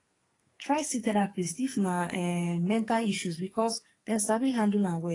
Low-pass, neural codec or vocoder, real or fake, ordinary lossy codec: 10.8 kHz; codec, 44.1 kHz, 2.6 kbps, SNAC; fake; AAC, 32 kbps